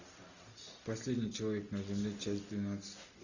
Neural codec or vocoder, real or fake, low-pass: none; real; 7.2 kHz